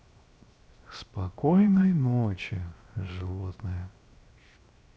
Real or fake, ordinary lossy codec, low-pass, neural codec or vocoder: fake; none; none; codec, 16 kHz, 0.7 kbps, FocalCodec